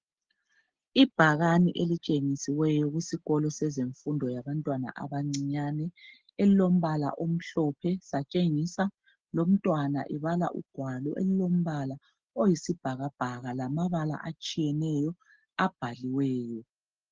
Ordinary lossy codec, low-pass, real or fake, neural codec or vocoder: Opus, 16 kbps; 7.2 kHz; real; none